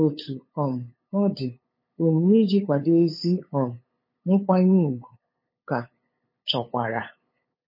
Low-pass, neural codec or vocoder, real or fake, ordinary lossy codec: 5.4 kHz; codec, 16 kHz, 8 kbps, FunCodec, trained on LibriTTS, 25 frames a second; fake; MP3, 24 kbps